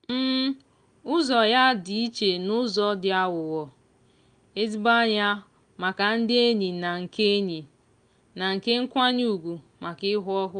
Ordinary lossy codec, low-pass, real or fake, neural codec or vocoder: Opus, 32 kbps; 9.9 kHz; real; none